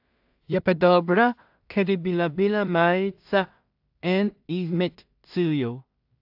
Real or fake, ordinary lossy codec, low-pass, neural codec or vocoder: fake; AAC, 48 kbps; 5.4 kHz; codec, 16 kHz in and 24 kHz out, 0.4 kbps, LongCat-Audio-Codec, two codebook decoder